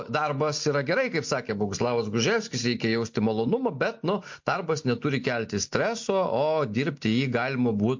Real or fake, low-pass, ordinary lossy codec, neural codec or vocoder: real; 7.2 kHz; MP3, 48 kbps; none